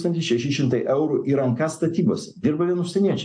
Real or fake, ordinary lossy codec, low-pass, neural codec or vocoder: real; AAC, 64 kbps; 9.9 kHz; none